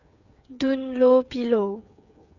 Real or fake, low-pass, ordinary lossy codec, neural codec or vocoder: fake; 7.2 kHz; none; codec, 16 kHz, 8 kbps, FreqCodec, smaller model